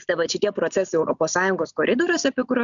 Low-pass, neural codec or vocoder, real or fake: 7.2 kHz; none; real